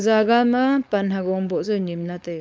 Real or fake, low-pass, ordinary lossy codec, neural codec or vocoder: fake; none; none; codec, 16 kHz, 4.8 kbps, FACodec